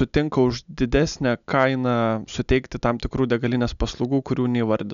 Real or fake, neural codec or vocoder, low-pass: real; none; 7.2 kHz